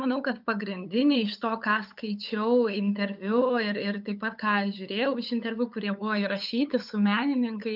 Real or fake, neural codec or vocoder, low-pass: fake; codec, 16 kHz, 8 kbps, FunCodec, trained on LibriTTS, 25 frames a second; 5.4 kHz